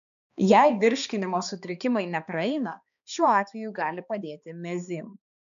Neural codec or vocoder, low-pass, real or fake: codec, 16 kHz, 2 kbps, X-Codec, HuBERT features, trained on balanced general audio; 7.2 kHz; fake